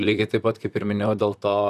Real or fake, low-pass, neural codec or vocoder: fake; 14.4 kHz; vocoder, 44.1 kHz, 128 mel bands, Pupu-Vocoder